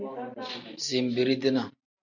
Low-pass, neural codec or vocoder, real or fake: 7.2 kHz; none; real